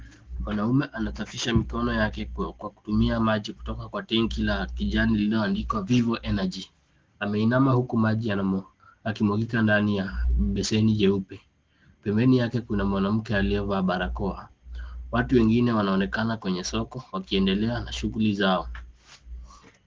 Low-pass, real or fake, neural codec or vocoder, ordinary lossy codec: 7.2 kHz; real; none; Opus, 16 kbps